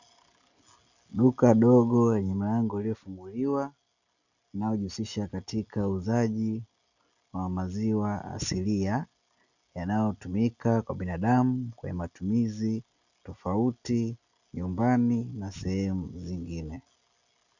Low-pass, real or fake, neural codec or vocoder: 7.2 kHz; real; none